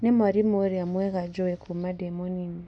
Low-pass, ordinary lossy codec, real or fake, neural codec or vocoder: 9.9 kHz; none; real; none